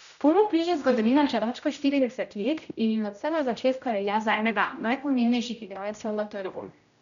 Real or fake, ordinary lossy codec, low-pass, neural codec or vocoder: fake; none; 7.2 kHz; codec, 16 kHz, 0.5 kbps, X-Codec, HuBERT features, trained on general audio